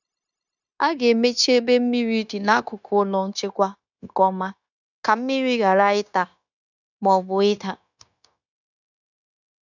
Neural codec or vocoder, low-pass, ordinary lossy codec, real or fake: codec, 16 kHz, 0.9 kbps, LongCat-Audio-Codec; 7.2 kHz; none; fake